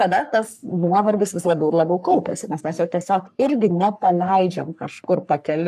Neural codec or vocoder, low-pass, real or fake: codec, 44.1 kHz, 3.4 kbps, Pupu-Codec; 14.4 kHz; fake